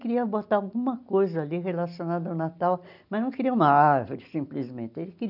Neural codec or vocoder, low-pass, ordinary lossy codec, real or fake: none; 5.4 kHz; none; real